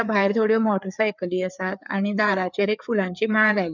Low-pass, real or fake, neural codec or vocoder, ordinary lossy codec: 7.2 kHz; fake; codec, 16 kHz, 4 kbps, FreqCodec, larger model; none